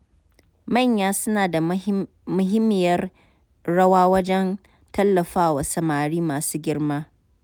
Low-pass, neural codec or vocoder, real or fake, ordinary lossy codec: none; none; real; none